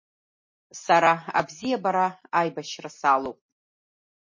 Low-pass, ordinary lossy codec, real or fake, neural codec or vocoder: 7.2 kHz; MP3, 32 kbps; real; none